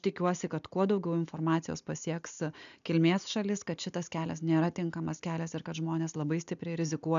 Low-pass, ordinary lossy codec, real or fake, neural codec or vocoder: 7.2 kHz; MP3, 96 kbps; real; none